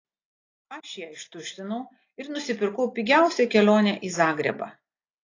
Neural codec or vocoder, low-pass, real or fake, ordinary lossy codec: none; 7.2 kHz; real; AAC, 32 kbps